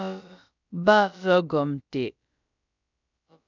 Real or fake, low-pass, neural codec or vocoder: fake; 7.2 kHz; codec, 16 kHz, about 1 kbps, DyCAST, with the encoder's durations